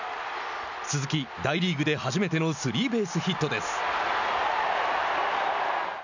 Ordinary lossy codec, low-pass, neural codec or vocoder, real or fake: none; 7.2 kHz; none; real